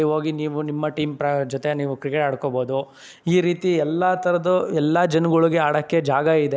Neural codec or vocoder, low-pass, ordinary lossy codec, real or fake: none; none; none; real